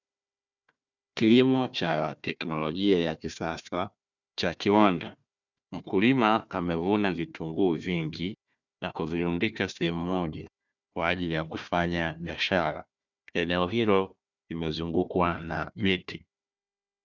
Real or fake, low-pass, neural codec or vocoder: fake; 7.2 kHz; codec, 16 kHz, 1 kbps, FunCodec, trained on Chinese and English, 50 frames a second